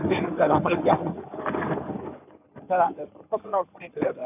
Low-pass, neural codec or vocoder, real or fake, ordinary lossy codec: 3.6 kHz; codec, 16 kHz in and 24 kHz out, 2.2 kbps, FireRedTTS-2 codec; fake; none